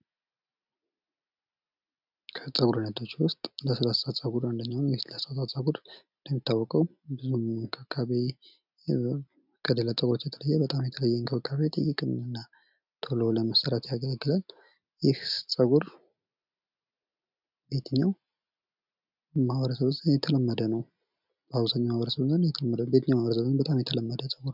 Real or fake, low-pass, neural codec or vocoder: real; 5.4 kHz; none